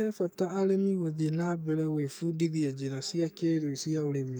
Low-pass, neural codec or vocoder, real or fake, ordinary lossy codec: none; codec, 44.1 kHz, 2.6 kbps, SNAC; fake; none